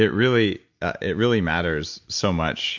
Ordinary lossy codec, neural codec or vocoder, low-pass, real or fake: MP3, 64 kbps; none; 7.2 kHz; real